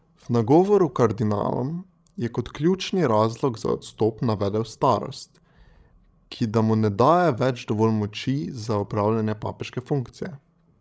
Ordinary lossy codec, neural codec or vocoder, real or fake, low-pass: none; codec, 16 kHz, 16 kbps, FreqCodec, larger model; fake; none